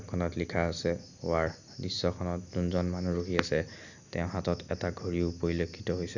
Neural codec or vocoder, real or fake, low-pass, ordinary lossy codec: none; real; 7.2 kHz; none